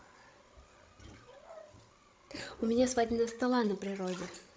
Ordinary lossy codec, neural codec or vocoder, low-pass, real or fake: none; codec, 16 kHz, 16 kbps, FreqCodec, larger model; none; fake